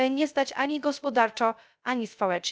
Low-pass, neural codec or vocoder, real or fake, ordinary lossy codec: none; codec, 16 kHz, 0.3 kbps, FocalCodec; fake; none